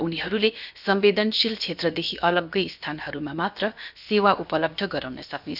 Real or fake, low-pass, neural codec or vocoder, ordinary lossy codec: fake; 5.4 kHz; codec, 16 kHz, about 1 kbps, DyCAST, with the encoder's durations; none